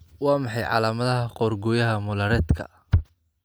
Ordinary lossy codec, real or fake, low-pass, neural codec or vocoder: none; real; none; none